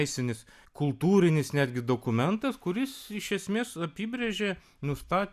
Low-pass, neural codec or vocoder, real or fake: 14.4 kHz; none; real